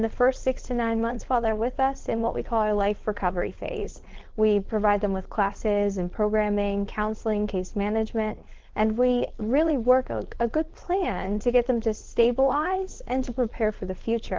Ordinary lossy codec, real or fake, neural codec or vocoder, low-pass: Opus, 16 kbps; fake; codec, 16 kHz, 4.8 kbps, FACodec; 7.2 kHz